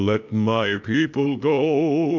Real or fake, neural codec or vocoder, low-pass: fake; codec, 16 kHz, 0.8 kbps, ZipCodec; 7.2 kHz